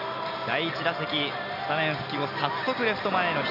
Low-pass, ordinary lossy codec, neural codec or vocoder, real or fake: 5.4 kHz; none; none; real